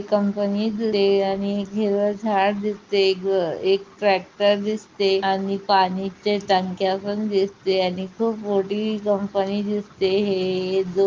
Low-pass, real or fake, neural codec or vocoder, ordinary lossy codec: 7.2 kHz; real; none; Opus, 32 kbps